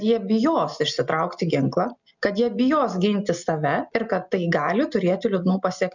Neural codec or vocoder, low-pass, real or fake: none; 7.2 kHz; real